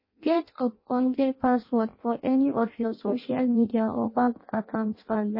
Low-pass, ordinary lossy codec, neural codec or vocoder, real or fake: 5.4 kHz; MP3, 24 kbps; codec, 16 kHz in and 24 kHz out, 0.6 kbps, FireRedTTS-2 codec; fake